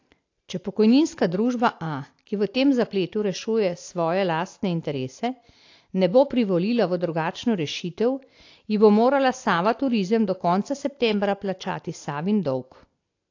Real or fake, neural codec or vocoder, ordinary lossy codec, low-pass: real; none; AAC, 48 kbps; 7.2 kHz